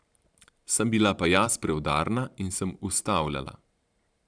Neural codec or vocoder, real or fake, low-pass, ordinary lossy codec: vocoder, 44.1 kHz, 128 mel bands every 512 samples, BigVGAN v2; fake; 9.9 kHz; none